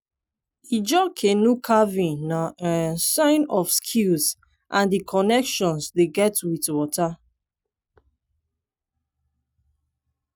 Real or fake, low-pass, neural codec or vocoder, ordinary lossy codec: real; none; none; none